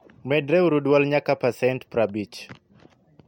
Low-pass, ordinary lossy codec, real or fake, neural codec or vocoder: 19.8 kHz; MP3, 64 kbps; real; none